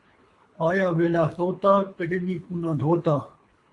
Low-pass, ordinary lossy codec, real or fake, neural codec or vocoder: 10.8 kHz; AAC, 48 kbps; fake; codec, 24 kHz, 3 kbps, HILCodec